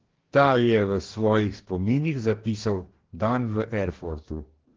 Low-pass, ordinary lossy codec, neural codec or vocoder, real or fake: 7.2 kHz; Opus, 16 kbps; codec, 44.1 kHz, 2.6 kbps, DAC; fake